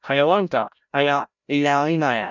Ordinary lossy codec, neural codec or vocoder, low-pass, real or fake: none; codec, 16 kHz, 0.5 kbps, FreqCodec, larger model; 7.2 kHz; fake